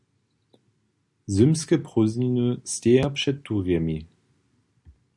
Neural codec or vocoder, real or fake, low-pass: none; real; 10.8 kHz